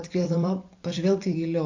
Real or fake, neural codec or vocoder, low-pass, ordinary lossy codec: fake; vocoder, 44.1 kHz, 128 mel bands every 256 samples, BigVGAN v2; 7.2 kHz; AAC, 48 kbps